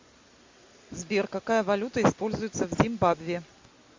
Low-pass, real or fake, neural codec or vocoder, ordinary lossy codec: 7.2 kHz; real; none; MP3, 48 kbps